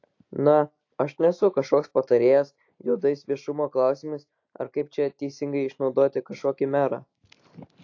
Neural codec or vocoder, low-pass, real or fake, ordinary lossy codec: none; 7.2 kHz; real; AAC, 48 kbps